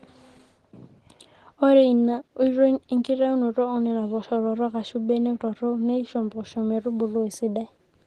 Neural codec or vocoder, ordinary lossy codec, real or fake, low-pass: none; Opus, 16 kbps; real; 14.4 kHz